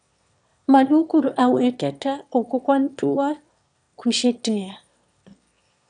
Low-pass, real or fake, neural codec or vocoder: 9.9 kHz; fake; autoencoder, 22.05 kHz, a latent of 192 numbers a frame, VITS, trained on one speaker